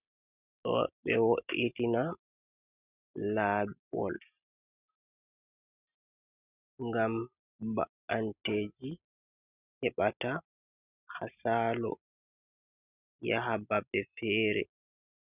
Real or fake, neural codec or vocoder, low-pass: real; none; 3.6 kHz